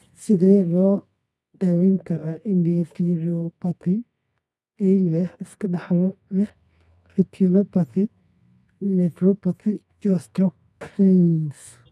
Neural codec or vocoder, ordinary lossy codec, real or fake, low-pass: codec, 24 kHz, 0.9 kbps, WavTokenizer, medium music audio release; none; fake; none